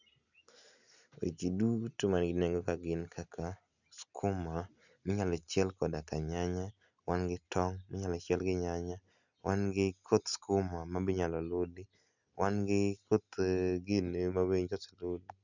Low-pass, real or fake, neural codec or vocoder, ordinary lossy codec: 7.2 kHz; real; none; none